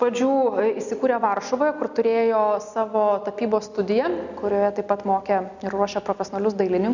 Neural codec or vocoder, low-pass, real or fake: none; 7.2 kHz; real